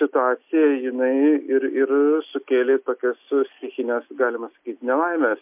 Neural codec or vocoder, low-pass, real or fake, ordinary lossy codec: none; 3.6 kHz; real; MP3, 32 kbps